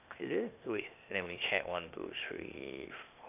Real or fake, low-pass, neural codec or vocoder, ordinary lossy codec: fake; 3.6 kHz; codec, 16 kHz, 0.8 kbps, ZipCodec; none